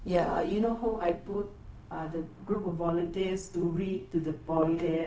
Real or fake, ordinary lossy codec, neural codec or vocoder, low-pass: fake; none; codec, 16 kHz, 0.4 kbps, LongCat-Audio-Codec; none